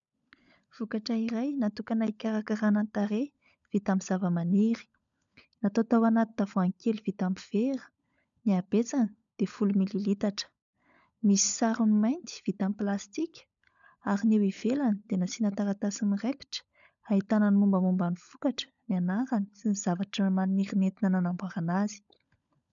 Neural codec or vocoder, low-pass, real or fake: codec, 16 kHz, 16 kbps, FunCodec, trained on LibriTTS, 50 frames a second; 7.2 kHz; fake